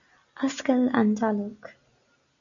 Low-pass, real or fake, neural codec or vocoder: 7.2 kHz; real; none